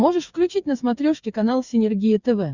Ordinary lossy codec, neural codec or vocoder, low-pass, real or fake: Opus, 64 kbps; codec, 16 kHz, 8 kbps, FreqCodec, smaller model; 7.2 kHz; fake